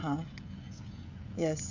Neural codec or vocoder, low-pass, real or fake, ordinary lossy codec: codec, 16 kHz, 16 kbps, FreqCodec, smaller model; 7.2 kHz; fake; none